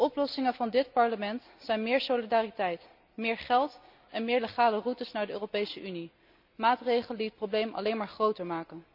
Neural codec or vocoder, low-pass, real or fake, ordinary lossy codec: none; 5.4 kHz; real; none